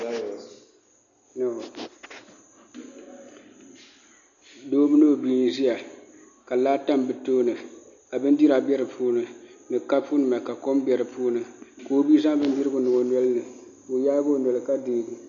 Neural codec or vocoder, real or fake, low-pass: none; real; 7.2 kHz